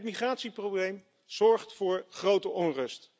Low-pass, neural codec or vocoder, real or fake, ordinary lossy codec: none; none; real; none